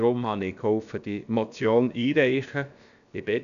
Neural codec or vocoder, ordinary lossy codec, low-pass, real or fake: codec, 16 kHz, about 1 kbps, DyCAST, with the encoder's durations; none; 7.2 kHz; fake